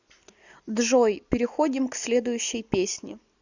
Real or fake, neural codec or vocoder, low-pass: real; none; 7.2 kHz